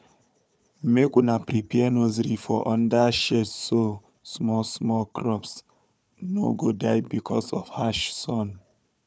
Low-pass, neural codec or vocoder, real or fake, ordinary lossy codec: none; codec, 16 kHz, 4 kbps, FunCodec, trained on Chinese and English, 50 frames a second; fake; none